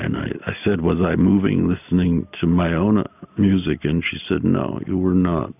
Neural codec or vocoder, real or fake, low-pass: none; real; 3.6 kHz